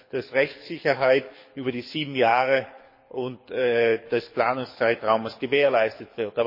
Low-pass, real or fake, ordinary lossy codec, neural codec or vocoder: 5.4 kHz; fake; MP3, 24 kbps; codec, 24 kHz, 6 kbps, HILCodec